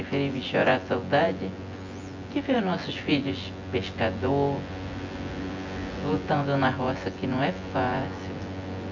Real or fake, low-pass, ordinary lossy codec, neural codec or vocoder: fake; 7.2 kHz; MP3, 48 kbps; vocoder, 24 kHz, 100 mel bands, Vocos